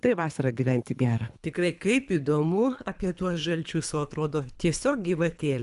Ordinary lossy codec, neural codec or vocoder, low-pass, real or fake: MP3, 96 kbps; codec, 24 kHz, 3 kbps, HILCodec; 10.8 kHz; fake